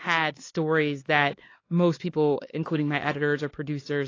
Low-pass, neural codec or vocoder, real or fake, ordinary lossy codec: 7.2 kHz; codec, 16 kHz, 4 kbps, X-Codec, HuBERT features, trained on LibriSpeech; fake; AAC, 32 kbps